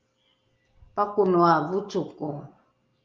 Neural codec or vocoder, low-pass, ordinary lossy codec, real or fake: none; 7.2 kHz; Opus, 24 kbps; real